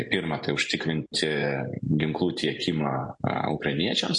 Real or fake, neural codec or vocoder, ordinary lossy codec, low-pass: real; none; MP3, 48 kbps; 10.8 kHz